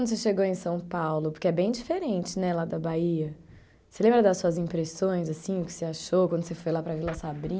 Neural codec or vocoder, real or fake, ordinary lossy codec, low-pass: none; real; none; none